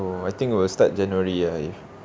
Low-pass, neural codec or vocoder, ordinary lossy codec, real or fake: none; none; none; real